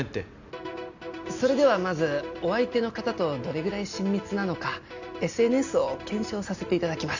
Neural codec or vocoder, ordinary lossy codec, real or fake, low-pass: none; none; real; 7.2 kHz